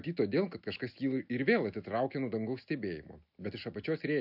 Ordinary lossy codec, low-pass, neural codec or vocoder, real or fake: AAC, 48 kbps; 5.4 kHz; none; real